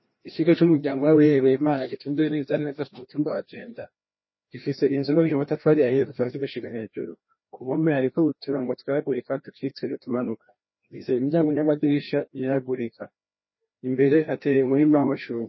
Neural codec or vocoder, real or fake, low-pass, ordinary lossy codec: codec, 16 kHz, 1 kbps, FreqCodec, larger model; fake; 7.2 kHz; MP3, 24 kbps